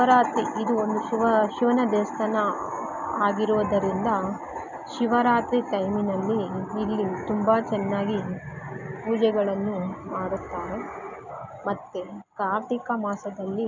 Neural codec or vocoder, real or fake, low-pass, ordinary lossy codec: none; real; 7.2 kHz; none